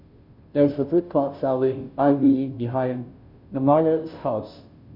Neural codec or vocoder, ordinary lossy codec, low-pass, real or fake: codec, 16 kHz, 0.5 kbps, FunCodec, trained on Chinese and English, 25 frames a second; none; 5.4 kHz; fake